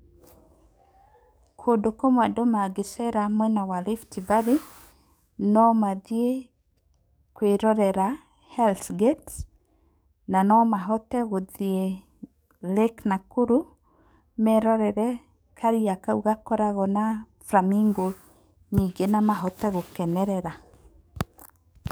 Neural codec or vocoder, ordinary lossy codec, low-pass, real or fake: codec, 44.1 kHz, 7.8 kbps, DAC; none; none; fake